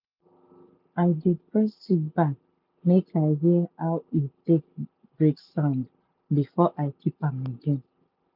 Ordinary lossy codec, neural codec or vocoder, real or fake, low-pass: none; none; real; 5.4 kHz